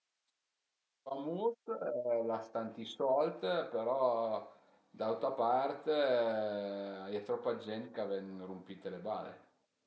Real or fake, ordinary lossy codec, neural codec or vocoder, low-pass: real; none; none; none